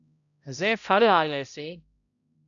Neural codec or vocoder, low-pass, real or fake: codec, 16 kHz, 0.5 kbps, X-Codec, HuBERT features, trained on balanced general audio; 7.2 kHz; fake